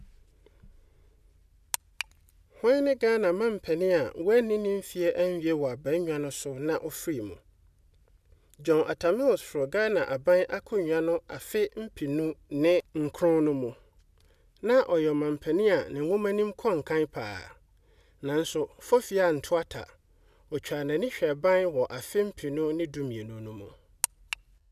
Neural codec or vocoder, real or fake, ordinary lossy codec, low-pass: none; real; none; 14.4 kHz